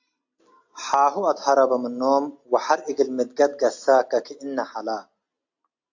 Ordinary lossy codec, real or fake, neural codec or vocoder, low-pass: AAC, 48 kbps; real; none; 7.2 kHz